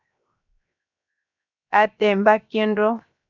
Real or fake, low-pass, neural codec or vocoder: fake; 7.2 kHz; codec, 16 kHz, 0.7 kbps, FocalCodec